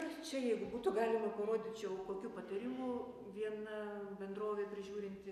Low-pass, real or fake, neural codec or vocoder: 14.4 kHz; real; none